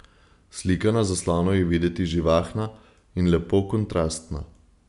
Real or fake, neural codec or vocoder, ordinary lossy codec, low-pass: real; none; none; 10.8 kHz